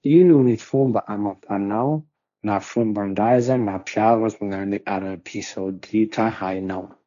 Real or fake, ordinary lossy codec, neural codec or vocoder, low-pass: fake; none; codec, 16 kHz, 1.1 kbps, Voila-Tokenizer; 7.2 kHz